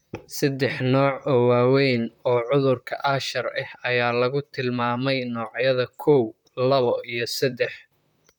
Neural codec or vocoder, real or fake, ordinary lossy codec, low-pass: vocoder, 44.1 kHz, 128 mel bands, Pupu-Vocoder; fake; none; 19.8 kHz